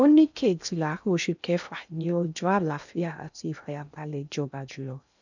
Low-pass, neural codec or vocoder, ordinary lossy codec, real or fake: 7.2 kHz; codec, 16 kHz in and 24 kHz out, 0.6 kbps, FocalCodec, streaming, 2048 codes; none; fake